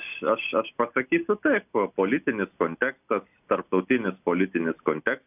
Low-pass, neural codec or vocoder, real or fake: 3.6 kHz; none; real